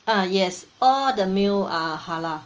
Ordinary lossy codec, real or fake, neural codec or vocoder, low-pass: Opus, 24 kbps; real; none; 7.2 kHz